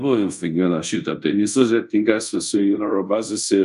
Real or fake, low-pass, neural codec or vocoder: fake; 10.8 kHz; codec, 24 kHz, 0.5 kbps, DualCodec